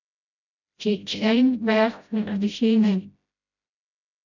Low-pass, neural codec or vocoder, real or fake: 7.2 kHz; codec, 16 kHz, 0.5 kbps, FreqCodec, smaller model; fake